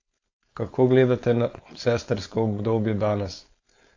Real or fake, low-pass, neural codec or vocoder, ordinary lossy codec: fake; 7.2 kHz; codec, 16 kHz, 4.8 kbps, FACodec; AAC, 48 kbps